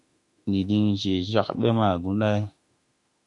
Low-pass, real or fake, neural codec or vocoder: 10.8 kHz; fake; autoencoder, 48 kHz, 32 numbers a frame, DAC-VAE, trained on Japanese speech